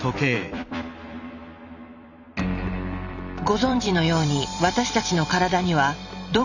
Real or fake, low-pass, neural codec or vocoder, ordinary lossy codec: fake; 7.2 kHz; vocoder, 44.1 kHz, 128 mel bands every 256 samples, BigVGAN v2; none